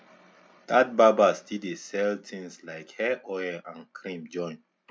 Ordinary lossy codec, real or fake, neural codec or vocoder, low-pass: none; real; none; none